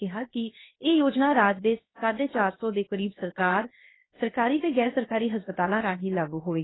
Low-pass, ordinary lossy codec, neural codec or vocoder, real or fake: 7.2 kHz; AAC, 16 kbps; codec, 16 kHz, 0.7 kbps, FocalCodec; fake